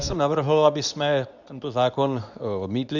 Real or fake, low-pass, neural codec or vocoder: fake; 7.2 kHz; codec, 24 kHz, 0.9 kbps, WavTokenizer, medium speech release version 2